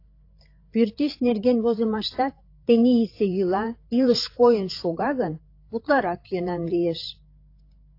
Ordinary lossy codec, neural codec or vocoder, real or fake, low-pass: AAC, 32 kbps; codec, 16 kHz, 8 kbps, FreqCodec, larger model; fake; 5.4 kHz